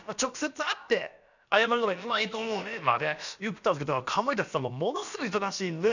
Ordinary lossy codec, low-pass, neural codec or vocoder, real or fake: none; 7.2 kHz; codec, 16 kHz, about 1 kbps, DyCAST, with the encoder's durations; fake